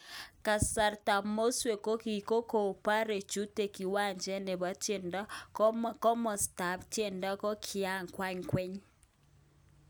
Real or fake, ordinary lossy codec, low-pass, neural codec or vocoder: real; none; none; none